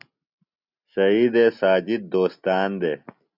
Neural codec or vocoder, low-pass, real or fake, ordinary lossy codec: none; 5.4 kHz; real; Opus, 64 kbps